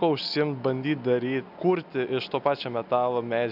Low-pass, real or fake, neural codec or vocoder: 5.4 kHz; real; none